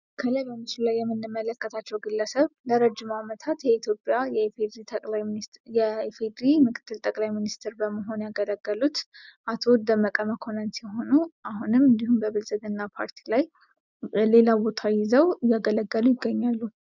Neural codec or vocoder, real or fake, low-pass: none; real; 7.2 kHz